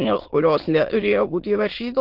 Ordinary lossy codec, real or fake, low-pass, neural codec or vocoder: Opus, 16 kbps; fake; 5.4 kHz; autoencoder, 22.05 kHz, a latent of 192 numbers a frame, VITS, trained on many speakers